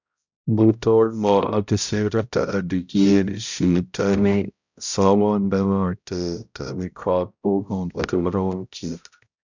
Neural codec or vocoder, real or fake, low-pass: codec, 16 kHz, 0.5 kbps, X-Codec, HuBERT features, trained on balanced general audio; fake; 7.2 kHz